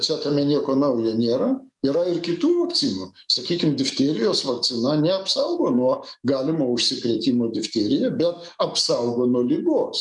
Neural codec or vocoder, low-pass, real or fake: codec, 44.1 kHz, 7.8 kbps, DAC; 10.8 kHz; fake